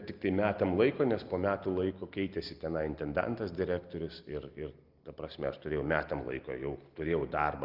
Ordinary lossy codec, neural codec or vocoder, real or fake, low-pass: Opus, 24 kbps; none; real; 5.4 kHz